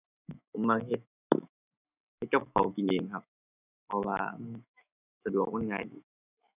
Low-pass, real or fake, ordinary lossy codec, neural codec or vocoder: 3.6 kHz; fake; none; autoencoder, 48 kHz, 128 numbers a frame, DAC-VAE, trained on Japanese speech